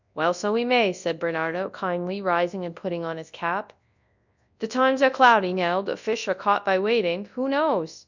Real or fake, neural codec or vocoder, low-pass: fake; codec, 24 kHz, 0.9 kbps, WavTokenizer, large speech release; 7.2 kHz